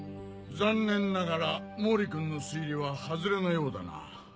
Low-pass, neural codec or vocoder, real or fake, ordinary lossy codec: none; none; real; none